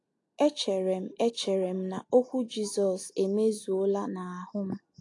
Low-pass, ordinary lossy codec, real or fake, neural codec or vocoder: 10.8 kHz; AAC, 48 kbps; real; none